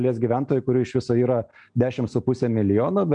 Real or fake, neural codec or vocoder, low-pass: real; none; 9.9 kHz